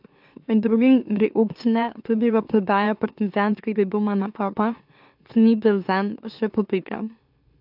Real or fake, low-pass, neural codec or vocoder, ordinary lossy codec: fake; 5.4 kHz; autoencoder, 44.1 kHz, a latent of 192 numbers a frame, MeloTTS; AAC, 48 kbps